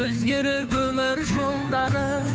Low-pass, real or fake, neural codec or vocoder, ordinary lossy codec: none; fake; codec, 16 kHz, 2 kbps, FunCodec, trained on Chinese and English, 25 frames a second; none